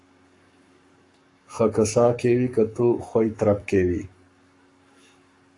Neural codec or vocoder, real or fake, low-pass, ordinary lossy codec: codec, 44.1 kHz, 7.8 kbps, Pupu-Codec; fake; 10.8 kHz; AAC, 48 kbps